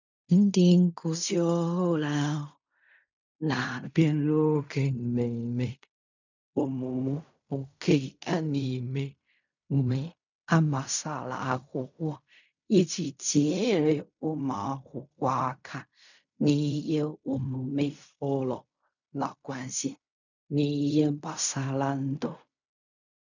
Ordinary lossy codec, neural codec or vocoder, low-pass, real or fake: none; codec, 16 kHz in and 24 kHz out, 0.4 kbps, LongCat-Audio-Codec, fine tuned four codebook decoder; 7.2 kHz; fake